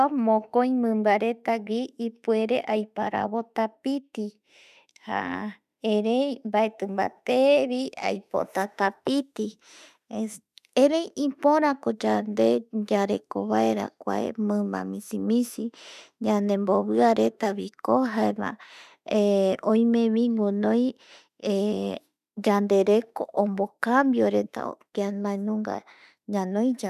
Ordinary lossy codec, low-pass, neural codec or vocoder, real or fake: none; 14.4 kHz; autoencoder, 48 kHz, 32 numbers a frame, DAC-VAE, trained on Japanese speech; fake